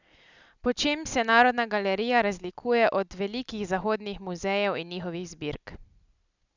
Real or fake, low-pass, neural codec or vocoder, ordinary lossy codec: real; 7.2 kHz; none; none